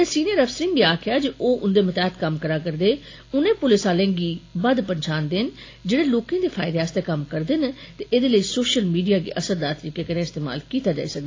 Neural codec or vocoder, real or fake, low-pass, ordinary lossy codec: none; real; 7.2 kHz; AAC, 32 kbps